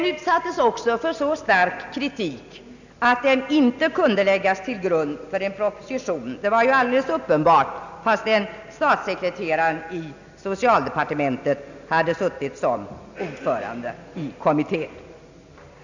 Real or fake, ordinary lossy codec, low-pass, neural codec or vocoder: real; none; 7.2 kHz; none